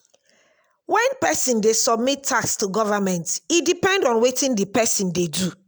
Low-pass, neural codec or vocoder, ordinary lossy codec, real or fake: none; none; none; real